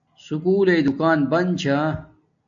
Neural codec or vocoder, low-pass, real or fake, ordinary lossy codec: none; 7.2 kHz; real; AAC, 64 kbps